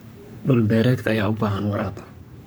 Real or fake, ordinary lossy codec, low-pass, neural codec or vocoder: fake; none; none; codec, 44.1 kHz, 3.4 kbps, Pupu-Codec